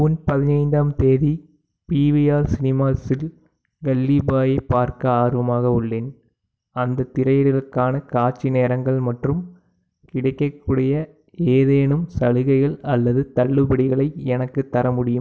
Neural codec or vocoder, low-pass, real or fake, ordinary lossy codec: none; none; real; none